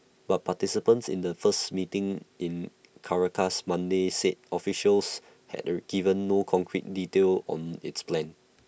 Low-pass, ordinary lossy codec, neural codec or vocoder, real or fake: none; none; none; real